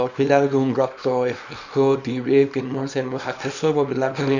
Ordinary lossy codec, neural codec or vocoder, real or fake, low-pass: none; codec, 24 kHz, 0.9 kbps, WavTokenizer, small release; fake; 7.2 kHz